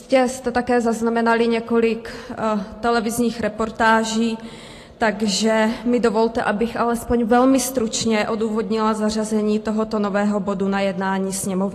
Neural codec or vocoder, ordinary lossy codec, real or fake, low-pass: none; AAC, 48 kbps; real; 14.4 kHz